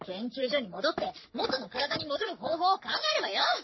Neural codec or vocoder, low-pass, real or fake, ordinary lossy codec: codec, 44.1 kHz, 3.4 kbps, Pupu-Codec; 7.2 kHz; fake; MP3, 24 kbps